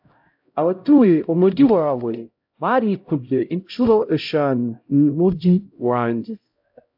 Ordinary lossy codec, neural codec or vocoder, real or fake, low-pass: AAC, 48 kbps; codec, 16 kHz, 0.5 kbps, X-Codec, HuBERT features, trained on LibriSpeech; fake; 5.4 kHz